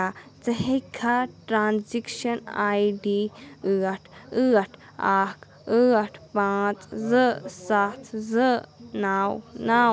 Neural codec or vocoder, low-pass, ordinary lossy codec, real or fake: none; none; none; real